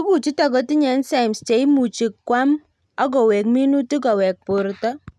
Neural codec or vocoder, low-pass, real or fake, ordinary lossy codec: none; none; real; none